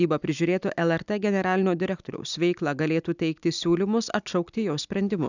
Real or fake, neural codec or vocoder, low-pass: real; none; 7.2 kHz